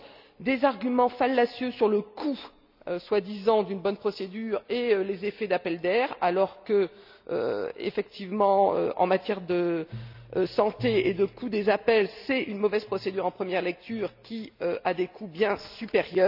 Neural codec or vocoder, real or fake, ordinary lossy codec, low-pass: none; real; none; 5.4 kHz